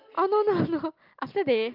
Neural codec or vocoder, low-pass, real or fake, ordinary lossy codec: none; 5.4 kHz; real; Opus, 32 kbps